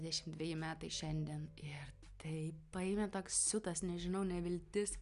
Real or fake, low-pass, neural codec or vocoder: real; 10.8 kHz; none